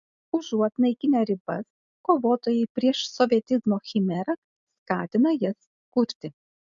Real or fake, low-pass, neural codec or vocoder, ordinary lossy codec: real; 7.2 kHz; none; MP3, 64 kbps